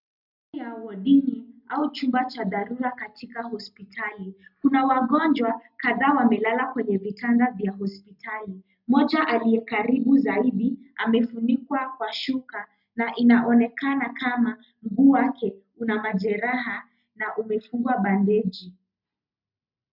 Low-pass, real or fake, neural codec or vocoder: 5.4 kHz; real; none